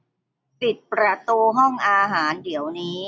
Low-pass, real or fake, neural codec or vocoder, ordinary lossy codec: none; real; none; none